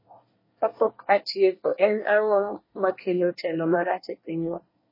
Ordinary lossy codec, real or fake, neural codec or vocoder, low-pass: MP3, 24 kbps; fake; codec, 24 kHz, 1 kbps, SNAC; 5.4 kHz